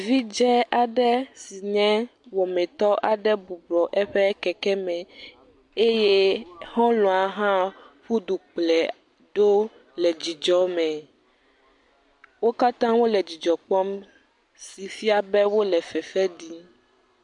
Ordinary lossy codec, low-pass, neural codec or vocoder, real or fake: MP3, 64 kbps; 10.8 kHz; none; real